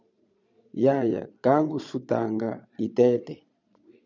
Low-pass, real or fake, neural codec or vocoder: 7.2 kHz; fake; vocoder, 22.05 kHz, 80 mel bands, Vocos